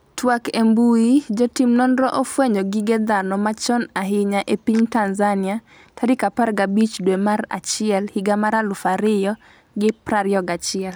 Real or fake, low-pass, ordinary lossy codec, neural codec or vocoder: real; none; none; none